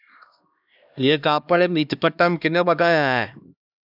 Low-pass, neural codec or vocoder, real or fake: 5.4 kHz; codec, 16 kHz, 1 kbps, X-Codec, HuBERT features, trained on LibriSpeech; fake